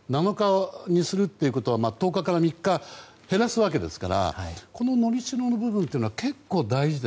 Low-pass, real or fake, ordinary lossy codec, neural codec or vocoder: none; real; none; none